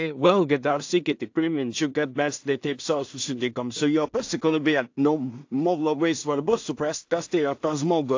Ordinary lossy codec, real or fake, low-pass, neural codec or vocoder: AAC, 48 kbps; fake; 7.2 kHz; codec, 16 kHz in and 24 kHz out, 0.4 kbps, LongCat-Audio-Codec, two codebook decoder